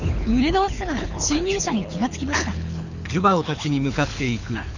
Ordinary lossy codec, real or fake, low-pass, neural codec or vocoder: none; fake; 7.2 kHz; codec, 24 kHz, 6 kbps, HILCodec